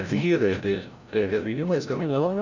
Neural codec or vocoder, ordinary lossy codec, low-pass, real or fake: codec, 16 kHz, 0.5 kbps, FreqCodec, larger model; none; 7.2 kHz; fake